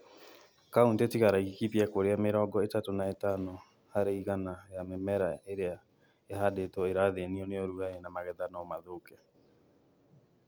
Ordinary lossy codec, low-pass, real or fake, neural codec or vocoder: none; none; real; none